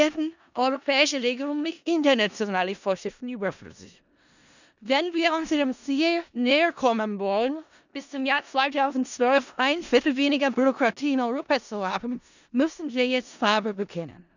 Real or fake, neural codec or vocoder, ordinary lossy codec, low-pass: fake; codec, 16 kHz in and 24 kHz out, 0.4 kbps, LongCat-Audio-Codec, four codebook decoder; none; 7.2 kHz